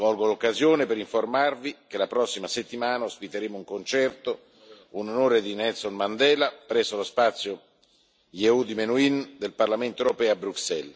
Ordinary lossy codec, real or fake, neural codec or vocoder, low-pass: none; real; none; none